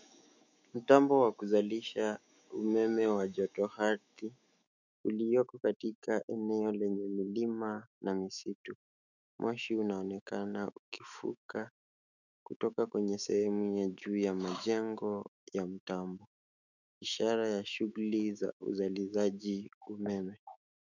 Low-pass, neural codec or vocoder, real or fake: 7.2 kHz; autoencoder, 48 kHz, 128 numbers a frame, DAC-VAE, trained on Japanese speech; fake